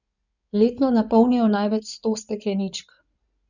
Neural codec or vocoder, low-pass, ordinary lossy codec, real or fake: codec, 16 kHz in and 24 kHz out, 2.2 kbps, FireRedTTS-2 codec; 7.2 kHz; none; fake